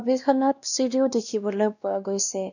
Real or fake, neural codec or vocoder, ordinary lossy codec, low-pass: fake; codec, 16 kHz, 1 kbps, X-Codec, WavLM features, trained on Multilingual LibriSpeech; none; 7.2 kHz